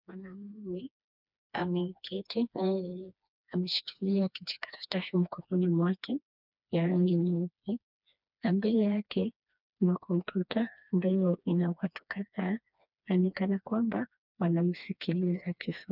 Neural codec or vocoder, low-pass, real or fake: codec, 16 kHz, 2 kbps, FreqCodec, smaller model; 5.4 kHz; fake